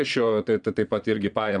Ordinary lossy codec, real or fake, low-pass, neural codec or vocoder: MP3, 96 kbps; fake; 9.9 kHz; vocoder, 22.05 kHz, 80 mel bands, Vocos